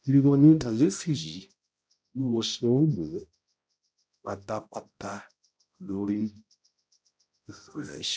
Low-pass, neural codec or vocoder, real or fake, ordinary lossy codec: none; codec, 16 kHz, 0.5 kbps, X-Codec, HuBERT features, trained on balanced general audio; fake; none